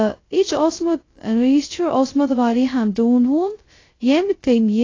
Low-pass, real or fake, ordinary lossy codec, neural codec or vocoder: 7.2 kHz; fake; AAC, 32 kbps; codec, 16 kHz, 0.2 kbps, FocalCodec